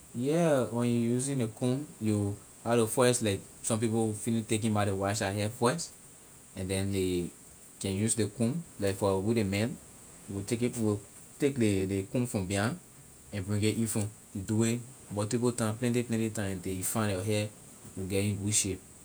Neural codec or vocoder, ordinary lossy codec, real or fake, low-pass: vocoder, 48 kHz, 128 mel bands, Vocos; none; fake; none